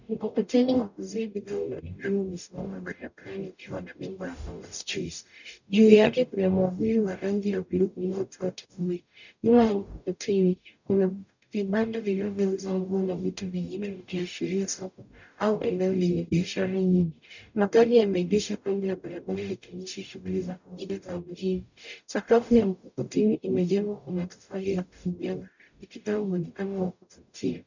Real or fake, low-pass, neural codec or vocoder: fake; 7.2 kHz; codec, 44.1 kHz, 0.9 kbps, DAC